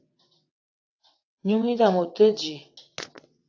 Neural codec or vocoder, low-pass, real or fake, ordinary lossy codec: vocoder, 22.05 kHz, 80 mel bands, WaveNeXt; 7.2 kHz; fake; AAC, 48 kbps